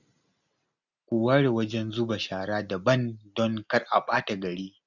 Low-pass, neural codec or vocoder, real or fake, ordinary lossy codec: 7.2 kHz; none; real; none